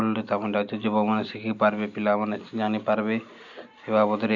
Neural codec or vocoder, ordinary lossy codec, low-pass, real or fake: none; none; 7.2 kHz; real